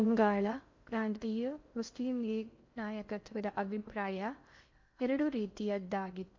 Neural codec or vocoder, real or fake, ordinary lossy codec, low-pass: codec, 16 kHz in and 24 kHz out, 0.6 kbps, FocalCodec, streaming, 2048 codes; fake; MP3, 48 kbps; 7.2 kHz